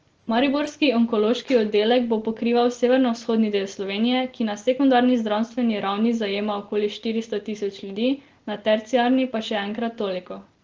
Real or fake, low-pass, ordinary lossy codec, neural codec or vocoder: real; 7.2 kHz; Opus, 16 kbps; none